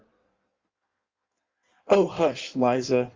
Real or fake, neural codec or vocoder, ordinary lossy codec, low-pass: fake; codec, 16 kHz in and 24 kHz out, 1.1 kbps, FireRedTTS-2 codec; Opus, 32 kbps; 7.2 kHz